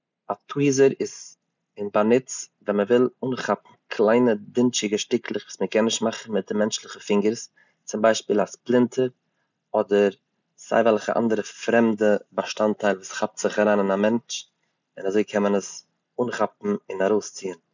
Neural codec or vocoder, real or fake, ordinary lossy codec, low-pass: none; real; none; 7.2 kHz